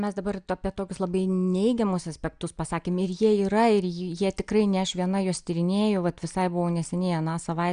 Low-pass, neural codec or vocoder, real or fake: 9.9 kHz; none; real